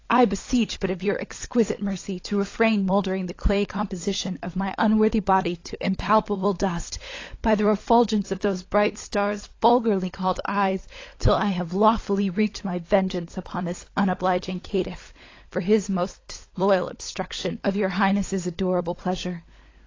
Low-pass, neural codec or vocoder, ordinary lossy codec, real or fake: 7.2 kHz; codec, 16 kHz, 16 kbps, FunCodec, trained on LibriTTS, 50 frames a second; AAC, 32 kbps; fake